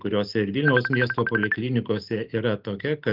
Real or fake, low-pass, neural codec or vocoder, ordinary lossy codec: real; 5.4 kHz; none; Opus, 32 kbps